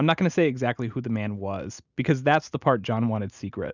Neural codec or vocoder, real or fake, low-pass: none; real; 7.2 kHz